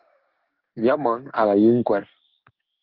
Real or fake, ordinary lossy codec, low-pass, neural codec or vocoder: fake; Opus, 32 kbps; 5.4 kHz; codec, 16 kHz in and 24 kHz out, 1 kbps, XY-Tokenizer